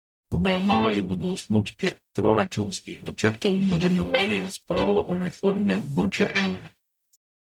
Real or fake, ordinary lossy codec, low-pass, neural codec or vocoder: fake; none; 19.8 kHz; codec, 44.1 kHz, 0.9 kbps, DAC